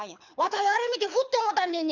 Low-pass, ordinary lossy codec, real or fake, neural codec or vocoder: 7.2 kHz; none; fake; codec, 24 kHz, 6 kbps, HILCodec